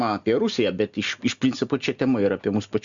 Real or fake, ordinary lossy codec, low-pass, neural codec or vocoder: real; Opus, 64 kbps; 7.2 kHz; none